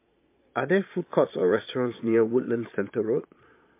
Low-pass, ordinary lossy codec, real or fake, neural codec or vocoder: 3.6 kHz; MP3, 24 kbps; fake; vocoder, 22.05 kHz, 80 mel bands, Vocos